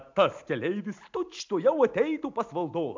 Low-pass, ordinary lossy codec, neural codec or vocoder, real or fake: 7.2 kHz; MP3, 64 kbps; none; real